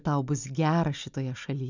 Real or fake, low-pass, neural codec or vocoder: real; 7.2 kHz; none